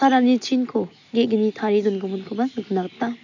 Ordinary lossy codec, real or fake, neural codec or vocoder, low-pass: none; real; none; 7.2 kHz